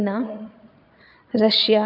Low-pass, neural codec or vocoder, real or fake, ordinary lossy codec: 5.4 kHz; vocoder, 22.05 kHz, 80 mel bands, Vocos; fake; none